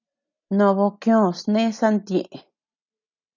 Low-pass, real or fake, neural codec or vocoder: 7.2 kHz; real; none